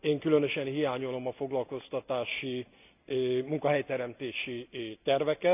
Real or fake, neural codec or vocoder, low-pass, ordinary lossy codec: real; none; 3.6 kHz; none